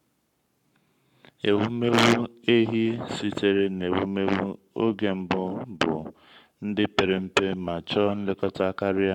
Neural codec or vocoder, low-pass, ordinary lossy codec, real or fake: codec, 44.1 kHz, 7.8 kbps, Pupu-Codec; 19.8 kHz; none; fake